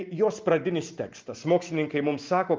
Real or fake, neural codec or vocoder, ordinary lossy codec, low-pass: real; none; Opus, 32 kbps; 7.2 kHz